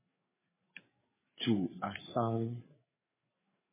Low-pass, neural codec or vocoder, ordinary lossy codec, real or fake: 3.6 kHz; codec, 16 kHz, 4 kbps, FreqCodec, larger model; MP3, 16 kbps; fake